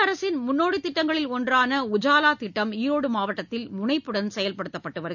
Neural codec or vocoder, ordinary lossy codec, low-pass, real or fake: none; none; 7.2 kHz; real